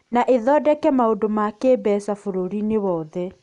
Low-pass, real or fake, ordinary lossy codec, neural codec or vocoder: 10.8 kHz; real; none; none